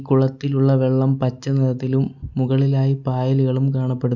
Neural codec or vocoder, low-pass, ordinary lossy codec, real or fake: none; 7.2 kHz; none; real